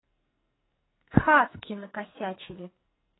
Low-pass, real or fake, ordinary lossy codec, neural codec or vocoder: 7.2 kHz; fake; AAC, 16 kbps; codec, 44.1 kHz, 2.6 kbps, SNAC